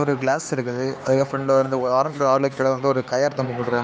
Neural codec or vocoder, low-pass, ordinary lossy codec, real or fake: codec, 16 kHz, 4 kbps, X-Codec, HuBERT features, trained on LibriSpeech; none; none; fake